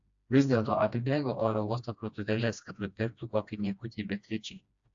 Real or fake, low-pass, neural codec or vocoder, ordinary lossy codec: fake; 7.2 kHz; codec, 16 kHz, 1 kbps, FreqCodec, smaller model; AAC, 64 kbps